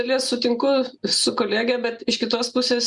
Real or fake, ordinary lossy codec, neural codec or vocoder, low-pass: real; Opus, 64 kbps; none; 10.8 kHz